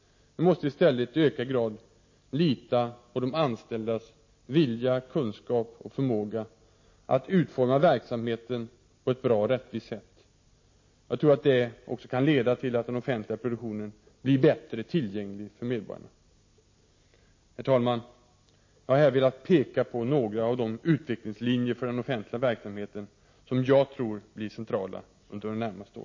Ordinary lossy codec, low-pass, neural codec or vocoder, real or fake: MP3, 32 kbps; 7.2 kHz; none; real